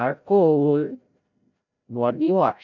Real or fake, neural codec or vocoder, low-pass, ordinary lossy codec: fake; codec, 16 kHz, 0.5 kbps, FreqCodec, larger model; 7.2 kHz; none